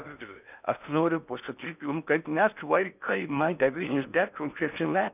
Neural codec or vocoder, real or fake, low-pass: codec, 16 kHz in and 24 kHz out, 0.6 kbps, FocalCodec, streaming, 4096 codes; fake; 3.6 kHz